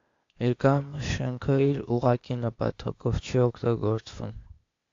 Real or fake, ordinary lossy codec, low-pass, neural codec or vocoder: fake; AAC, 48 kbps; 7.2 kHz; codec, 16 kHz, 0.8 kbps, ZipCodec